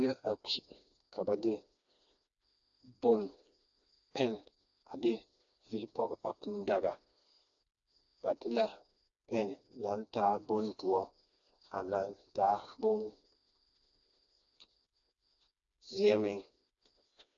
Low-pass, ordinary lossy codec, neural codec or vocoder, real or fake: 7.2 kHz; AAC, 64 kbps; codec, 16 kHz, 2 kbps, FreqCodec, smaller model; fake